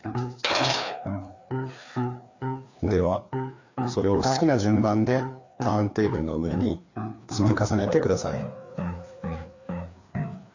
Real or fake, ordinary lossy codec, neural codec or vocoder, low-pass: fake; AAC, 48 kbps; codec, 16 kHz, 2 kbps, FreqCodec, larger model; 7.2 kHz